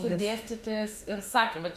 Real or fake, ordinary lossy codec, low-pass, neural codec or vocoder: fake; Opus, 64 kbps; 14.4 kHz; autoencoder, 48 kHz, 32 numbers a frame, DAC-VAE, trained on Japanese speech